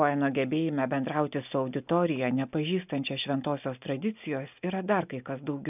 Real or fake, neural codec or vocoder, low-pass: real; none; 3.6 kHz